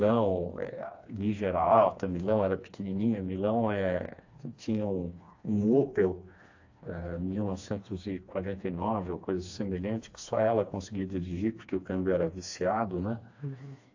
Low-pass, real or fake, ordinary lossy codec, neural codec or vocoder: 7.2 kHz; fake; AAC, 48 kbps; codec, 16 kHz, 2 kbps, FreqCodec, smaller model